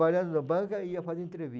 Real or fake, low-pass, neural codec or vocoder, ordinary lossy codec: real; none; none; none